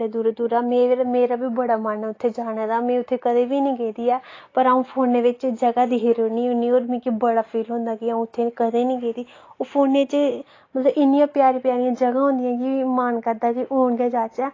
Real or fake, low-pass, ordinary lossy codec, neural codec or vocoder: real; 7.2 kHz; AAC, 32 kbps; none